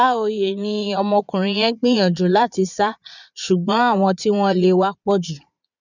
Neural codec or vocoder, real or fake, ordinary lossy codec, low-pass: vocoder, 22.05 kHz, 80 mel bands, Vocos; fake; none; 7.2 kHz